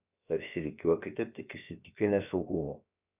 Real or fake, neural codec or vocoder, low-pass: fake; codec, 16 kHz, about 1 kbps, DyCAST, with the encoder's durations; 3.6 kHz